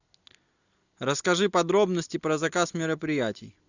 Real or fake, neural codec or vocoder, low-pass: real; none; 7.2 kHz